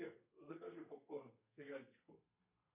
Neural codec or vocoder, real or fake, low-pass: codec, 32 kHz, 1.9 kbps, SNAC; fake; 3.6 kHz